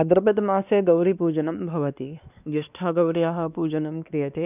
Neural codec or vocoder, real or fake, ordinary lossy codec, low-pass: codec, 16 kHz, 2 kbps, X-Codec, WavLM features, trained on Multilingual LibriSpeech; fake; none; 3.6 kHz